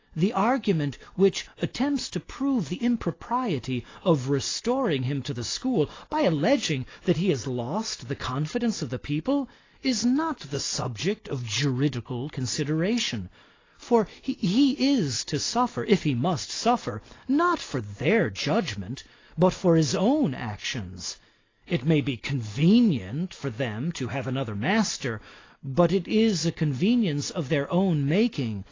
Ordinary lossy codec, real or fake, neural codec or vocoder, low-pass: AAC, 32 kbps; real; none; 7.2 kHz